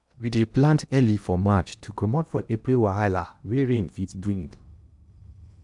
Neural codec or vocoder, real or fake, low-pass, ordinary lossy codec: codec, 16 kHz in and 24 kHz out, 0.8 kbps, FocalCodec, streaming, 65536 codes; fake; 10.8 kHz; none